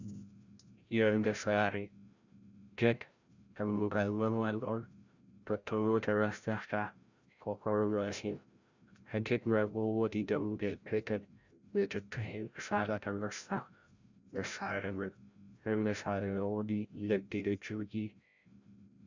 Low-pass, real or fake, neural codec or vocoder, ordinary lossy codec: 7.2 kHz; fake; codec, 16 kHz, 0.5 kbps, FreqCodec, larger model; Opus, 64 kbps